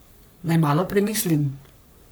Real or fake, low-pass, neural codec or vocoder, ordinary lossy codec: fake; none; codec, 44.1 kHz, 3.4 kbps, Pupu-Codec; none